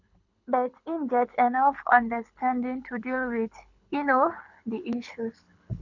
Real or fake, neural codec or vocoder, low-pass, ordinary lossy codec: fake; codec, 24 kHz, 6 kbps, HILCodec; 7.2 kHz; none